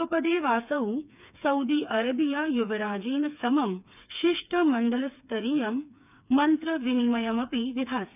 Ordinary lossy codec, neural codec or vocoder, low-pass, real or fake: none; codec, 16 kHz, 4 kbps, FreqCodec, smaller model; 3.6 kHz; fake